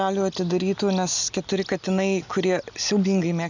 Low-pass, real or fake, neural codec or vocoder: 7.2 kHz; real; none